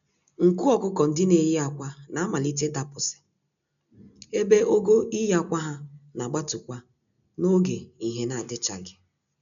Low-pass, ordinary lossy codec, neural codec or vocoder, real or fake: 7.2 kHz; none; none; real